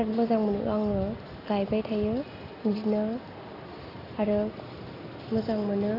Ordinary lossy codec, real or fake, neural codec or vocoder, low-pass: AAC, 32 kbps; real; none; 5.4 kHz